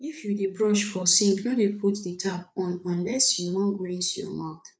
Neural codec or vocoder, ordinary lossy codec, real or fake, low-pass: codec, 16 kHz, 4 kbps, FreqCodec, larger model; none; fake; none